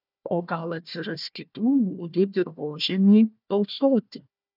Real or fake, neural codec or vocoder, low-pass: fake; codec, 16 kHz, 1 kbps, FunCodec, trained on Chinese and English, 50 frames a second; 5.4 kHz